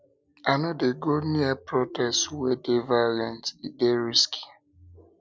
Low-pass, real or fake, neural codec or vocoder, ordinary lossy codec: none; real; none; none